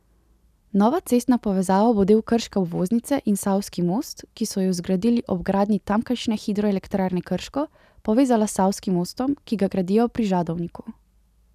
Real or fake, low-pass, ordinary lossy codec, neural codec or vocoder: real; 14.4 kHz; none; none